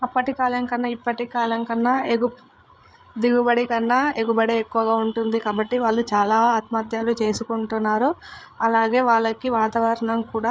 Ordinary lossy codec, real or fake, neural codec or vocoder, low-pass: none; fake; codec, 16 kHz, 8 kbps, FreqCodec, larger model; none